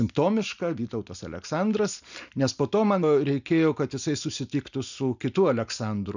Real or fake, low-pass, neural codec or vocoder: real; 7.2 kHz; none